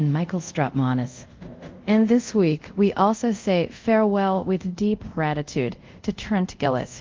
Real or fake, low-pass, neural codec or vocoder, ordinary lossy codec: fake; 7.2 kHz; codec, 24 kHz, 0.5 kbps, DualCodec; Opus, 32 kbps